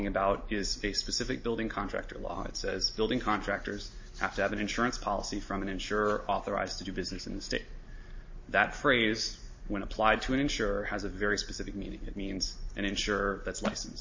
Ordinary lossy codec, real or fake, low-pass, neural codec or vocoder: MP3, 32 kbps; real; 7.2 kHz; none